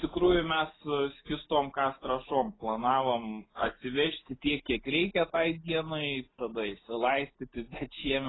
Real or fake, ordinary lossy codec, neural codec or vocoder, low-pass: real; AAC, 16 kbps; none; 7.2 kHz